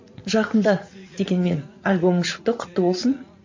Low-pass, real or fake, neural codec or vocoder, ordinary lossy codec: 7.2 kHz; fake; vocoder, 44.1 kHz, 80 mel bands, Vocos; MP3, 48 kbps